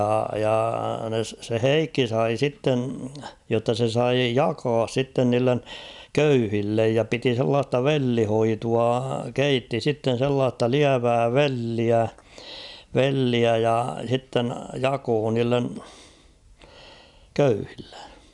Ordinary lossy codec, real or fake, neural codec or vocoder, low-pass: none; real; none; 10.8 kHz